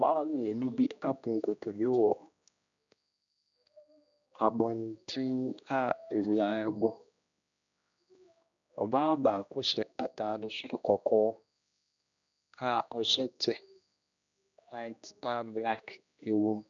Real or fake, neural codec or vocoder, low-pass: fake; codec, 16 kHz, 1 kbps, X-Codec, HuBERT features, trained on general audio; 7.2 kHz